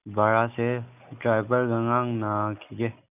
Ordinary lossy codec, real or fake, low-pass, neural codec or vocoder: none; real; 3.6 kHz; none